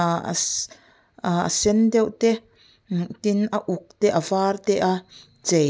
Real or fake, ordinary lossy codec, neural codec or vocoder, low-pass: real; none; none; none